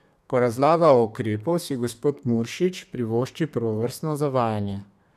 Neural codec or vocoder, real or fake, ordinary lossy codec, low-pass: codec, 32 kHz, 1.9 kbps, SNAC; fake; none; 14.4 kHz